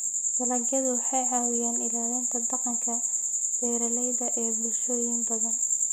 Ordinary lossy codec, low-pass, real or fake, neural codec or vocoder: none; none; real; none